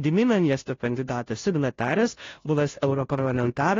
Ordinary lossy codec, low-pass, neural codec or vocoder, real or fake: AAC, 32 kbps; 7.2 kHz; codec, 16 kHz, 0.5 kbps, FunCodec, trained on Chinese and English, 25 frames a second; fake